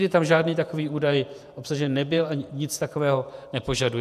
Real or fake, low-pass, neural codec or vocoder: fake; 14.4 kHz; vocoder, 48 kHz, 128 mel bands, Vocos